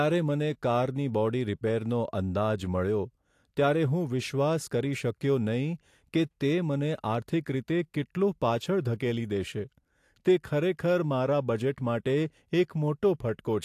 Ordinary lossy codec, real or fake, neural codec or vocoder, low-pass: AAC, 64 kbps; real; none; 14.4 kHz